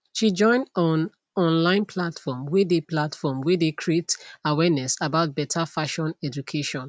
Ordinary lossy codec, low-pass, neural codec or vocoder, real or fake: none; none; none; real